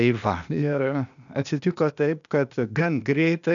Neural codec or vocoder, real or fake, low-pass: codec, 16 kHz, 0.8 kbps, ZipCodec; fake; 7.2 kHz